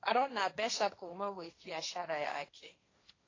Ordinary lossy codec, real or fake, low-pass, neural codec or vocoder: AAC, 32 kbps; fake; 7.2 kHz; codec, 16 kHz, 1.1 kbps, Voila-Tokenizer